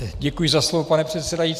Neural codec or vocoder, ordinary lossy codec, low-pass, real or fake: none; Opus, 64 kbps; 14.4 kHz; real